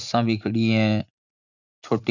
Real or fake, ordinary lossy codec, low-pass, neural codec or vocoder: fake; none; 7.2 kHz; vocoder, 44.1 kHz, 80 mel bands, Vocos